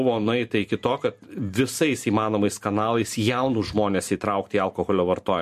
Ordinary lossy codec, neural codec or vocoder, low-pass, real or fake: MP3, 64 kbps; vocoder, 44.1 kHz, 128 mel bands every 512 samples, BigVGAN v2; 14.4 kHz; fake